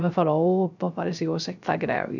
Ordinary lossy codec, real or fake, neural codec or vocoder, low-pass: none; fake; codec, 16 kHz, 0.3 kbps, FocalCodec; 7.2 kHz